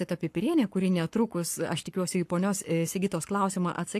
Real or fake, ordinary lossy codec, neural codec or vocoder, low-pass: fake; AAC, 64 kbps; codec, 44.1 kHz, 7.8 kbps, Pupu-Codec; 14.4 kHz